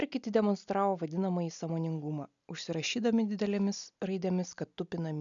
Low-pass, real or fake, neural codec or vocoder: 7.2 kHz; real; none